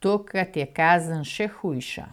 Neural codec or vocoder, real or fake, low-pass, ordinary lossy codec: none; real; 19.8 kHz; none